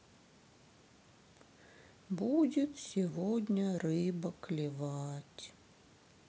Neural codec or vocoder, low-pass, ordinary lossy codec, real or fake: none; none; none; real